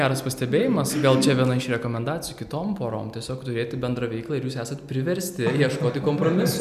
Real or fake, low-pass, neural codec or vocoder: real; 14.4 kHz; none